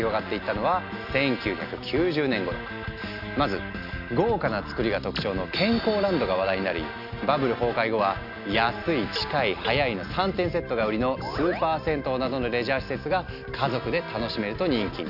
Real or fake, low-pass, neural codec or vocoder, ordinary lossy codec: real; 5.4 kHz; none; none